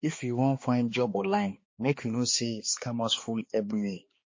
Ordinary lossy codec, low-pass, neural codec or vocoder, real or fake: MP3, 32 kbps; 7.2 kHz; codec, 16 kHz, 2 kbps, X-Codec, HuBERT features, trained on balanced general audio; fake